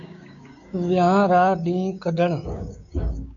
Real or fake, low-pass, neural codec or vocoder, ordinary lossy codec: fake; 7.2 kHz; codec, 16 kHz, 6 kbps, DAC; MP3, 96 kbps